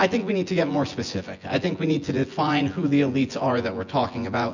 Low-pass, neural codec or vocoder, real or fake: 7.2 kHz; vocoder, 24 kHz, 100 mel bands, Vocos; fake